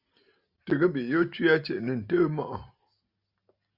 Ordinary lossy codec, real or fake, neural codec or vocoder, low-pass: Opus, 64 kbps; real; none; 5.4 kHz